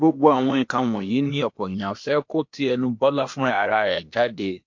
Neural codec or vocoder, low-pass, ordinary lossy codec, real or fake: codec, 16 kHz, 0.8 kbps, ZipCodec; 7.2 kHz; MP3, 48 kbps; fake